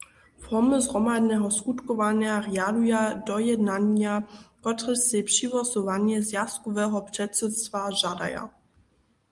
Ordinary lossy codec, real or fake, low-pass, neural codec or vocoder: Opus, 32 kbps; real; 10.8 kHz; none